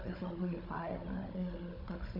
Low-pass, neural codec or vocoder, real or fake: 5.4 kHz; codec, 16 kHz, 16 kbps, FunCodec, trained on Chinese and English, 50 frames a second; fake